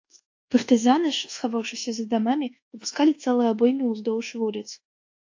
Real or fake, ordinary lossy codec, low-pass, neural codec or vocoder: fake; AAC, 48 kbps; 7.2 kHz; codec, 24 kHz, 1.2 kbps, DualCodec